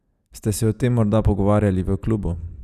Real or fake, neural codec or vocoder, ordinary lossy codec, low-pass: real; none; none; 14.4 kHz